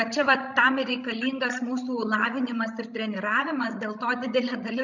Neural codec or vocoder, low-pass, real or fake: codec, 16 kHz, 16 kbps, FreqCodec, larger model; 7.2 kHz; fake